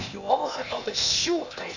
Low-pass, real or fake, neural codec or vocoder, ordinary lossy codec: 7.2 kHz; fake; codec, 16 kHz, 0.8 kbps, ZipCodec; none